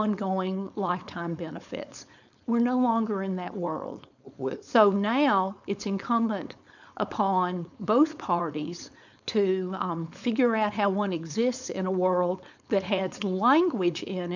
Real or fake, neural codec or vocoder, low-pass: fake; codec, 16 kHz, 4.8 kbps, FACodec; 7.2 kHz